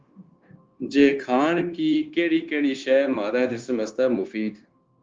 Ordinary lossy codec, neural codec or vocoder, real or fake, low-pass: Opus, 24 kbps; codec, 16 kHz, 0.9 kbps, LongCat-Audio-Codec; fake; 7.2 kHz